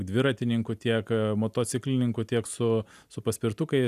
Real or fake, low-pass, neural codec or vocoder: real; 14.4 kHz; none